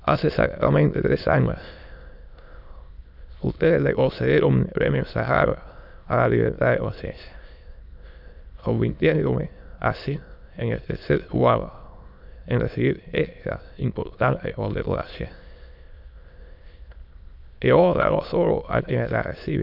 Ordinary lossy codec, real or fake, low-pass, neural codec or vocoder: none; fake; 5.4 kHz; autoencoder, 22.05 kHz, a latent of 192 numbers a frame, VITS, trained on many speakers